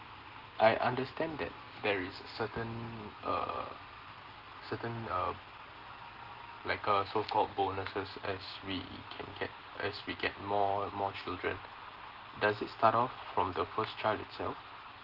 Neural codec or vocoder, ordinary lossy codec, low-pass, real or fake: none; Opus, 16 kbps; 5.4 kHz; real